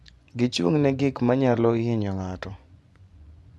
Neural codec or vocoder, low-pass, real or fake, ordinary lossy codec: vocoder, 24 kHz, 100 mel bands, Vocos; none; fake; none